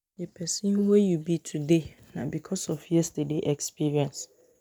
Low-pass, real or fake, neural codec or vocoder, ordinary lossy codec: none; real; none; none